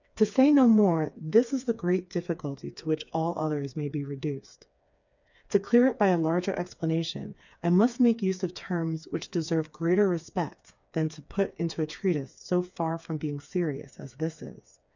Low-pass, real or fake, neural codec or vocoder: 7.2 kHz; fake; codec, 16 kHz, 4 kbps, FreqCodec, smaller model